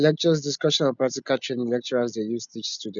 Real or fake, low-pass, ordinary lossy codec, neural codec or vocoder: real; 7.2 kHz; none; none